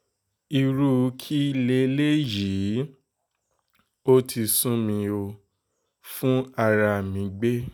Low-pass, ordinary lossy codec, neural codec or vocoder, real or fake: none; none; none; real